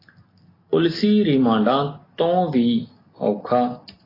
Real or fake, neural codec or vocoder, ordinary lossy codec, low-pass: real; none; AAC, 24 kbps; 5.4 kHz